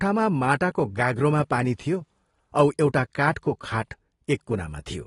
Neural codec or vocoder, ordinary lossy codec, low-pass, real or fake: none; AAC, 32 kbps; 10.8 kHz; real